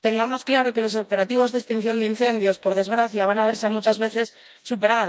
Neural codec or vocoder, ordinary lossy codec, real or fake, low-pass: codec, 16 kHz, 1 kbps, FreqCodec, smaller model; none; fake; none